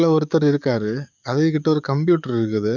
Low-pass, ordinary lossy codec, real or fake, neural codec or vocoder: 7.2 kHz; none; fake; codec, 44.1 kHz, 7.8 kbps, DAC